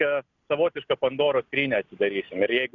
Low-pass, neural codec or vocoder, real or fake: 7.2 kHz; none; real